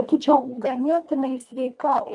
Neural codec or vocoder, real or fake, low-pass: codec, 24 kHz, 1.5 kbps, HILCodec; fake; 10.8 kHz